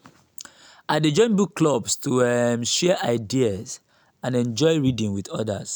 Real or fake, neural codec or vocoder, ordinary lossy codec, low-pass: real; none; none; none